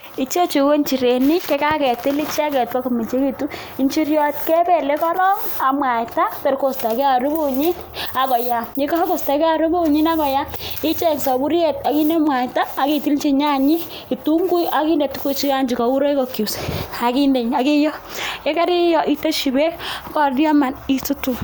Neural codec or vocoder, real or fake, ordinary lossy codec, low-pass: none; real; none; none